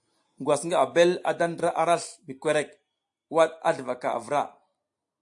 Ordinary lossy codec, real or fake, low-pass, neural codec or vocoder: AAC, 64 kbps; real; 10.8 kHz; none